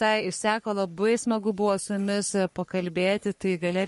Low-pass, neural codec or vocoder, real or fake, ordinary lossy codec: 14.4 kHz; codec, 44.1 kHz, 3.4 kbps, Pupu-Codec; fake; MP3, 48 kbps